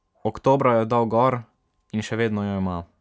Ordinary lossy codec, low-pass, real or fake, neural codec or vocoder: none; none; real; none